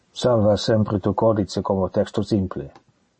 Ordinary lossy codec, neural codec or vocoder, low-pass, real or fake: MP3, 32 kbps; none; 9.9 kHz; real